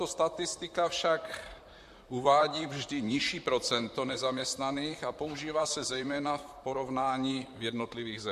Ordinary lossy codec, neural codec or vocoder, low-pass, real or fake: MP3, 64 kbps; vocoder, 44.1 kHz, 128 mel bands, Pupu-Vocoder; 14.4 kHz; fake